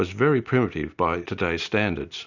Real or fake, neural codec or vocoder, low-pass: real; none; 7.2 kHz